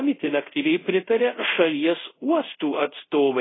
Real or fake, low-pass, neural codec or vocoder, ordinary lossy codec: fake; 7.2 kHz; codec, 24 kHz, 0.5 kbps, DualCodec; AAC, 16 kbps